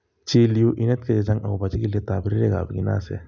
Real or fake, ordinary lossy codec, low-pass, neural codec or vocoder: real; none; 7.2 kHz; none